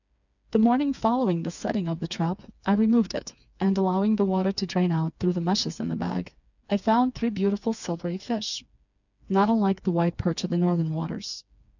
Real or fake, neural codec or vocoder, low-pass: fake; codec, 16 kHz, 4 kbps, FreqCodec, smaller model; 7.2 kHz